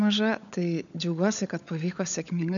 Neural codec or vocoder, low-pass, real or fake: codec, 16 kHz, 16 kbps, FunCodec, trained on LibriTTS, 50 frames a second; 7.2 kHz; fake